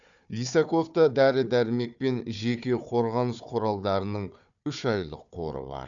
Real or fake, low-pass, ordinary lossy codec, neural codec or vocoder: fake; 7.2 kHz; none; codec, 16 kHz, 4 kbps, FunCodec, trained on Chinese and English, 50 frames a second